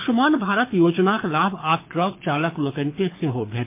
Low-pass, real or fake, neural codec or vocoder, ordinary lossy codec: 3.6 kHz; fake; codec, 24 kHz, 6 kbps, HILCodec; MP3, 24 kbps